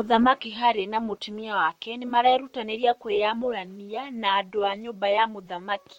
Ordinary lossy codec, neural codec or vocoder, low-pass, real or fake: MP3, 64 kbps; vocoder, 44.1 kHz, 128 mel bands, Pupu-Vocoder; 19.8 kHz; fake